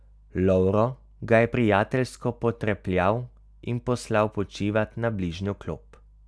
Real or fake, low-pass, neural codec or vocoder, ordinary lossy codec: real; none; none; none